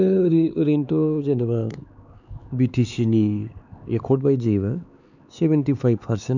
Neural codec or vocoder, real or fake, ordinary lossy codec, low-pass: codec, 16 kHz, 4 kbps, X-Codec, HuBERT features, trained on LibriSpeech; fake; none; 7.2 kHz